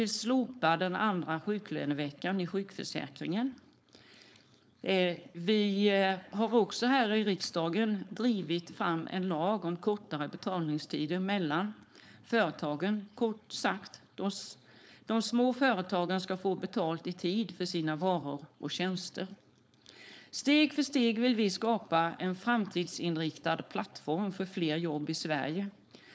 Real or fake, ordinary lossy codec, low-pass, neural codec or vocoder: fake; none; none; codec, 16 kHz, 4.8 kbps, FACodec